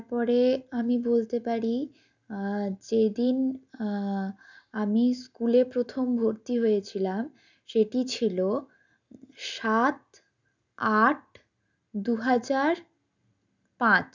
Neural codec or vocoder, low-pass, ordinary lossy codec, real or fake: none; 7.2 kHz; none; real